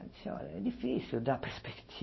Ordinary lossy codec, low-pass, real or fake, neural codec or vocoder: MP3, 24 kbps; 7.2 kHz; real; none